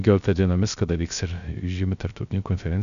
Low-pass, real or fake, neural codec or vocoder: 7.2 kHz; fake; codec, 16 kHz, 0.3 kbps, FocalCodec